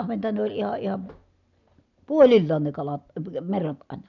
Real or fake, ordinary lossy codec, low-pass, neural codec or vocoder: real; none; 7.2 kHz; none